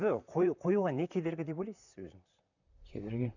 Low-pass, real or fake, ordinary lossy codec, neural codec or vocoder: 7.2 kHz; fake; none; vocoder, 44.1 kHz, 128 mel bands, Pupu-Vocoder